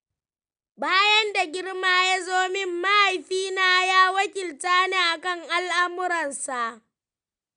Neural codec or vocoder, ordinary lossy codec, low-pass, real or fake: none; none; 9.9 kHz; real